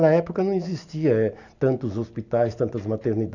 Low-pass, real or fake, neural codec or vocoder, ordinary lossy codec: 7.2 kHz; real; none; none